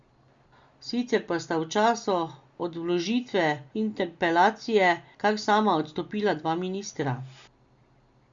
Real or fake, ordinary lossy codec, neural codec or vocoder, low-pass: real; Opus, 64 kbps; none; 7.2 kHz